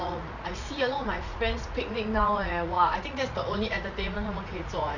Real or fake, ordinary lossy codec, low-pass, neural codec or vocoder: fake; none; 7.2 kHz; vocoder, 44.1 kHz, 80 mel bands, Vocos